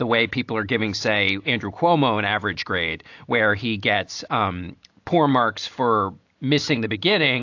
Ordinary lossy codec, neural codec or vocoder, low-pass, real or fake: AAC, 48 kbps; none; 7.2 kHz; real